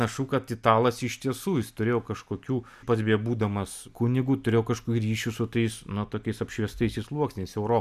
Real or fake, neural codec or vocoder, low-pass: real; none; 14.4 kHz